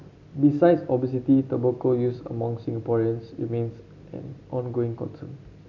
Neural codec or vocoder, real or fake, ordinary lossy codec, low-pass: none; real; none; 7.2 kHz